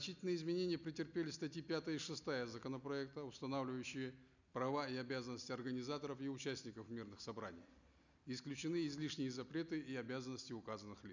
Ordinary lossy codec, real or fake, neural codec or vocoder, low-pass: none; real; none; 7.2 kHz